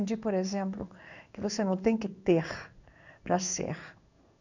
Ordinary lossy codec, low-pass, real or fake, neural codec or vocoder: none; 7.2 kHz; fake; codec, 16 kHz in and 24 kHz out, 1 kbps, XY-Tokenizer